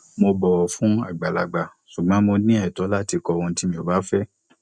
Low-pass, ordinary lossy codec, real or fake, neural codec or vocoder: 9.9 kHz; none; real; none